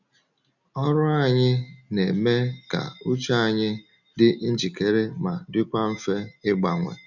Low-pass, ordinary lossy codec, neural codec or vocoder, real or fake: 7.2 kHz; none; none; real